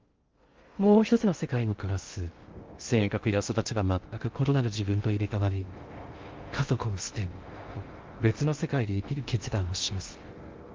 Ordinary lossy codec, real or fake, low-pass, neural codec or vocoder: Opus, 32 kbps; fake; 7.2 kHz; codec, 16 kHz in and 24 kHz out, 0.6 kbps, FocalCodec, streaming, 2048 codes